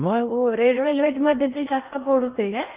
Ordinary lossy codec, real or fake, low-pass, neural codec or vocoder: Opus, 32 kbps; fake; 3.6 kHz; codec, 16 kHz in and 24 kHz out, 0.6 kbps, FocalCodec, streaming, 4096 codes